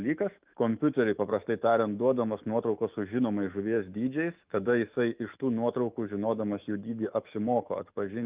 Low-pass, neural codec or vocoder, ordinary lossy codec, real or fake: 3.6 kHz; autoencoder, 48 kHz, 128 numbers a frame, DAC-VAE, trained on Japanese speech; Opus, 24 kbps; fake